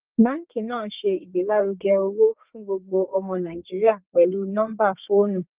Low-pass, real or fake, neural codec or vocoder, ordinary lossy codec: 3.6 kHz; fake; codec, 32 kHz, 1.9 kbps, SNAC; Opus, 32 kbps